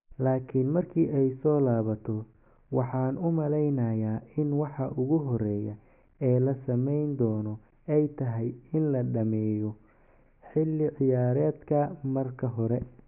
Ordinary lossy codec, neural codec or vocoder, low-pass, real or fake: none; none; 3.6 kHz; real